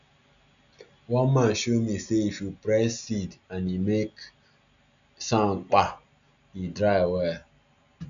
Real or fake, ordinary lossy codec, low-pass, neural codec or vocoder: real; none; 7.2 kHz; none